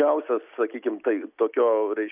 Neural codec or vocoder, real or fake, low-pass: none; real; 3.6 kHz